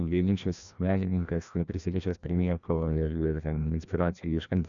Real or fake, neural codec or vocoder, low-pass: fake; codec, 16 kHz, 1 kbps, FreqCodec, larger model; 7.2 kHz